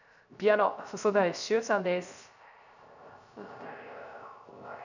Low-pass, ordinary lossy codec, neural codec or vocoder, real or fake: 7.2 kHz; none; codec, 16 kHz, 0.3 kbps, FocalCodec; fake